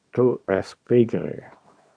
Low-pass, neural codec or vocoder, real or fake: 9.9 kHz; codec, 24 kHz, 0.9 kbps, WavTokenizer, small release; fake